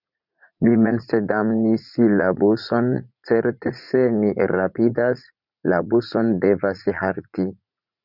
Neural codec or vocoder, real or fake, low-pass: vocoder, 44.1 kHz, 80 mel bands, Vocos; fake; 5.4 kHz